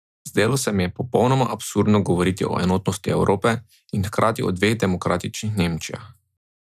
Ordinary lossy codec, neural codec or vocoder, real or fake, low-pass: none; none; real; 14.4 kHz